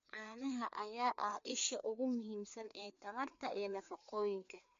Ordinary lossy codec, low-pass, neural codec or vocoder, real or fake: MP3, 48 kbps; 7.2 kHz; codec, 16 kHz, 2 kbps, FreqCodec, larger model; fake